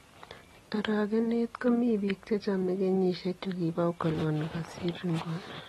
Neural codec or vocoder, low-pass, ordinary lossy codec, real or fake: none; 19.8 kHz; AAC, 32 kbps; real